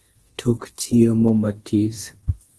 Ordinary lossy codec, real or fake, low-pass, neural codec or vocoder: Opus, 16 kbps; fake; 10.8 kHz; codec, 24 kHz, 0.9 kbps, DualCodec